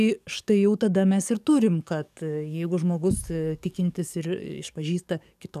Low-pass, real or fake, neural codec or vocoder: 14.4 kHz; fake; codec, 44.1 kHz, 7.8 kbps, DAC